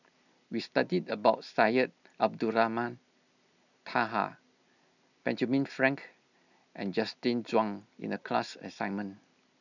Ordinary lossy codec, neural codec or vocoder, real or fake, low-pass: none; none; real; 7.2 kHz